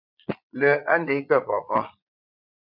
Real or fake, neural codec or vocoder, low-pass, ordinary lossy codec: fake; vocoder, 22.05 kHz, 80 mel bands, Vocos; 5.4 kHz; MP3, 48 kbps